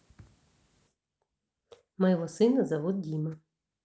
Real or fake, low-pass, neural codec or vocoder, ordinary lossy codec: real; none; none; none